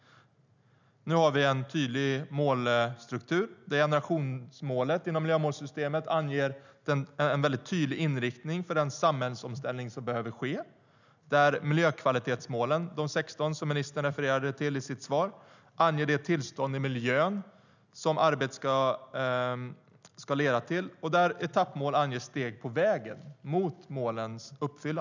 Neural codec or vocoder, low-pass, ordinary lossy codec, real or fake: none; 7.2 kHz; none; real